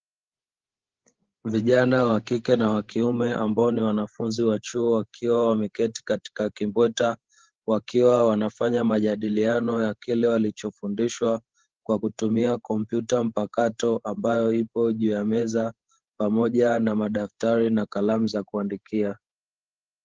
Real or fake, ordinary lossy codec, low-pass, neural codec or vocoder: fake; Opus, 16 kbps; 7.2 kHz; codec, 16 kHz, 16 kbps, FreqCodec, larger model